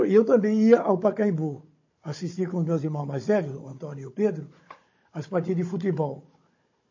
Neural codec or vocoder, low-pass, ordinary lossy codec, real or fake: codec, 16 kHz, 16 kbps, FunCodec, trained on Chinese and English, 50 frames a second; 7.2 kHz; MP3, 32 kbps; fake